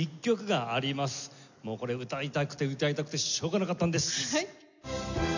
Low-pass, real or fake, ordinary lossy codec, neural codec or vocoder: 7.2 kHz; real; none; none